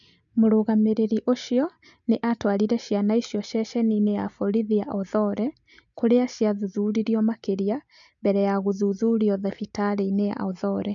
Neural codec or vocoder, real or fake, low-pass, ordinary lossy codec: none; real; 7.2 kHz; none